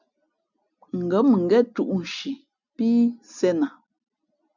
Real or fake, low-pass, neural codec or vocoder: real; 7.2 kHz; none